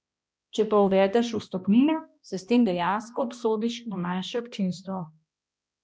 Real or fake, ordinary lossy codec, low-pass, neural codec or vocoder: fake; none; none; codec, 16 kHz, 1 kbps, X-Codec, HuBERT features, trained on balanced general audio